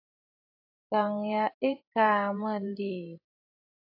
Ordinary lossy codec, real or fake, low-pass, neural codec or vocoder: AAC, 32 kbps; fake; 5.4 kHz; vocoder, 44.1 kHz, 128 mel bands every 512 samples, BigVGAN v2